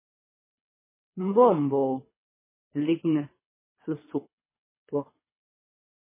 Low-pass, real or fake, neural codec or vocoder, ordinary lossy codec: 3.6 kHz; fake; codec, 24 kHz, 3 kbps, HILCodec; MP3, 16 kbps